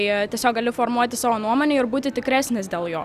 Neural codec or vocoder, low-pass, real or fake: none; 14.4 kHz; real